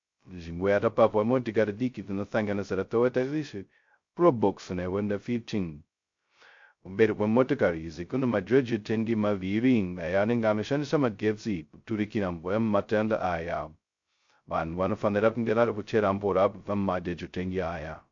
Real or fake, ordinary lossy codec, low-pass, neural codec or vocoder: fake; MP3, 48 kbps; 7.2 kHz; codec, 16 kHz, 0.2 kbps, FocalCodec